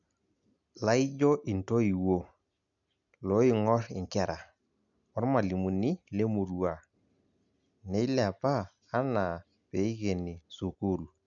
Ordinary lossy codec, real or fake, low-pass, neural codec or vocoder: MP3, 96 kbps; real; 7.2 kHz; none